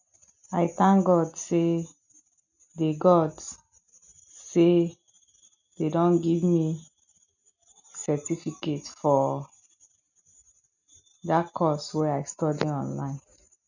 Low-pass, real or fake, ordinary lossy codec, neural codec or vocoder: 7.2 kHz; real; none; none